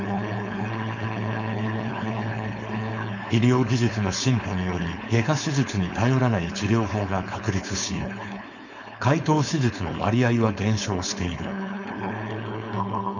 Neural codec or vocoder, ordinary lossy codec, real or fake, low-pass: codec, 16 kHz, 4.8 kbps, FACodec; none; fake; 7.2 kHz